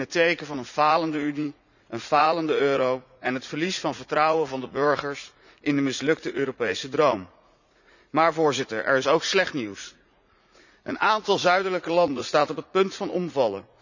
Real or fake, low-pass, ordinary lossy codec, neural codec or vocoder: fake; 7.2 kHz; none; vocoder, 44.1 kHz, 80 mel bands, Vocos